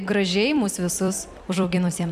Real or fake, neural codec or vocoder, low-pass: fake; vocoder, 44.1 kHz, 128 mel bands every 256 samples, BigVGAN v2; 14.4 kHz